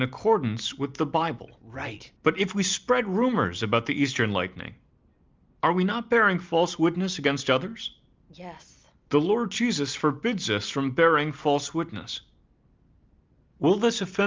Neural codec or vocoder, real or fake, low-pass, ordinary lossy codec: none; real; 7.2 kHz; Opus, 24 kbps